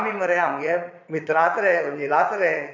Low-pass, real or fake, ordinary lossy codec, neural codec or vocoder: 7.2 kHz; fake; none; vocoder, 22.05 kHz, 80 mel bands, Vocos